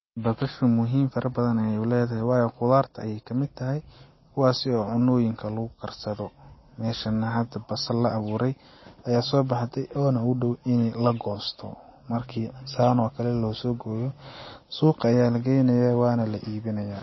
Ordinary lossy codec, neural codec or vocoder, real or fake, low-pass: MP3, 24 kbps; none; real; 7.2 kHz